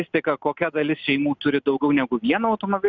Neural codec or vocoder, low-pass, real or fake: none; 7.2 kHz; real